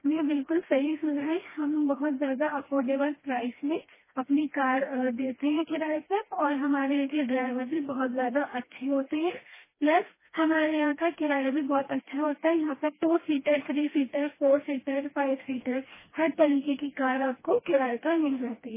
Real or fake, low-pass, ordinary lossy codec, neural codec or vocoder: fake; 3.6 kHz; MP3, 16 kbps; codec, 16 kHz, 1 kbps, FreqCodec, smaller model